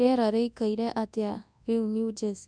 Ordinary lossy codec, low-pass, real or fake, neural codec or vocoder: none; 9.9 kHz; fake; codec, 24 kHz, 0.9 kbps, WavTokenizer, large speech release